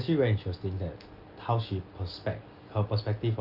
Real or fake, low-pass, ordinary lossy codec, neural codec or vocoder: real; 5.4 kHz; Opus, 24 kbps; none